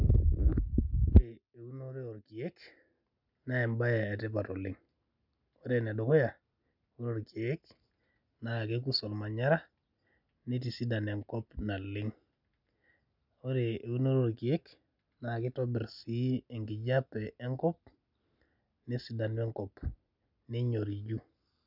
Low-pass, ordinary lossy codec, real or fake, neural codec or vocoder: 5.4 kHz; none; real; none